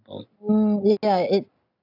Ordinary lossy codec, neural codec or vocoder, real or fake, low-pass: none; none; real; 5.4 kHz